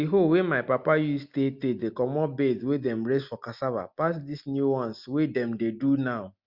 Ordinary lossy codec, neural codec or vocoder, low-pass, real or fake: Opus, 64 kbps; none; 5.4 kHz; real